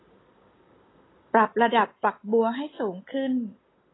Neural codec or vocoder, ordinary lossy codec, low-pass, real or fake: none; AAC, 16 kbps; 7.2 kHz; real